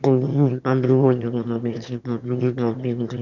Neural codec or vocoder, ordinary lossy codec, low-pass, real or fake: autoencoder, 22.05 kHz, a latent of 192 numbers a frame, VITS, trained on one speaker; none; 7.2 kHz; fake